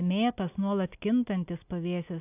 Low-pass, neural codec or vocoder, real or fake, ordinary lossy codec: 3.6 kHz; none; real; AAC, 32 kbps